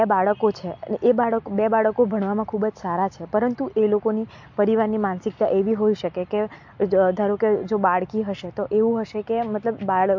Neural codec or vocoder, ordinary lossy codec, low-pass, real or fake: none; MP3, 48 kbps; 7.2 kHz; real